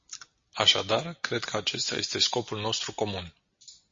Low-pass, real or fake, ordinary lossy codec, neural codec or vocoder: 7.2 kHz; real; MP3, 32 kbps; none